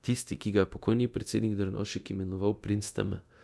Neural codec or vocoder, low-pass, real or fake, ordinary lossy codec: codec, 24 kHz, 0.9 kbps, DualCodec; none; fake; none